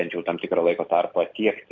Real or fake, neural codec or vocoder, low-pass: real; none; 7.2 kHz